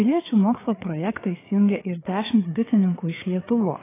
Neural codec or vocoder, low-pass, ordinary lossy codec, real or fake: codec, 16 kHz, 4 kbps, FreqCodec, larger model; 3.6 kHz; AAC, 16 kbps; fake